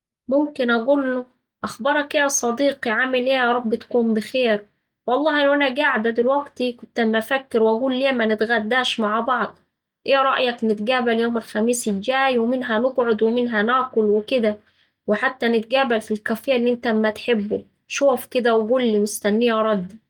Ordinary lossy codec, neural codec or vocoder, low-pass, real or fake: Opus, 32 kbps; none; 19.8 kHz; real